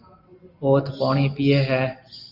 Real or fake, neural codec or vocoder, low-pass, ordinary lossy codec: real; none; 5.4 kHz; Opus, 24 kbps